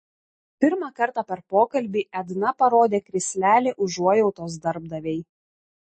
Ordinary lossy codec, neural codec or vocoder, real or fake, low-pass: MP3, 32 kbps; none; real; 9.9 kHz